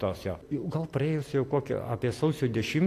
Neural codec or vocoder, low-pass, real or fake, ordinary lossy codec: none; 14.4 kHz; real; AAC, 64 kbps